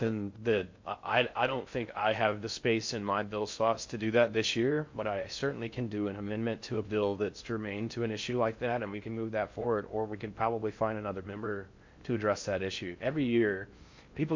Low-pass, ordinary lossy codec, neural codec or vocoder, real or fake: 7.2 kHz; MP3, 48 kbps; codec, 16 kHz in and 24 kHz out, 0.6 kbps, FocalCodec, streaming, 2048 codes; fake